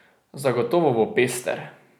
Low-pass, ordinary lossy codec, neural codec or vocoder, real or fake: none; none; none; real